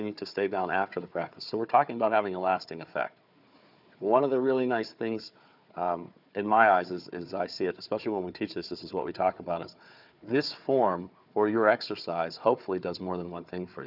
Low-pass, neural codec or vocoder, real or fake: 5.4 kHz; codec, 16 kHz, 4 kbps, FunCodec, trained on Chinese and English, 50 frames a second; fake